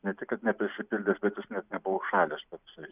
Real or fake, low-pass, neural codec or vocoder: real; 3.6 kHz; none